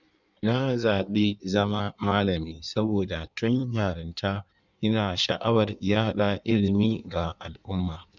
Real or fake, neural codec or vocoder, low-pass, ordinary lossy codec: fake; codec, 16 kHz in and 24 kHz out, 1.1 kbps, FireRedTTS-2 codec; 7.2 kHz; none